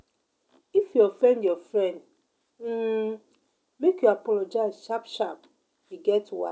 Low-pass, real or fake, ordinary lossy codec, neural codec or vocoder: none; real; none; none